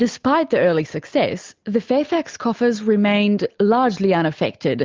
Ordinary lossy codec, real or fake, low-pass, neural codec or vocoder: Opus, 32 kbps; real; 7.2 kHz; none